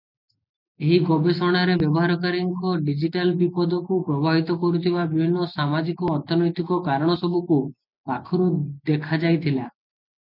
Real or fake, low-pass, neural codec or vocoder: real; 5.4 kHz; none